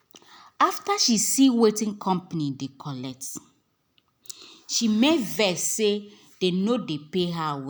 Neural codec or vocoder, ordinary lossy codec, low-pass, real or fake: none; none; none; real